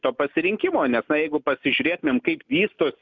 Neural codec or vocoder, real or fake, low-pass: none; real; 7.2 kHz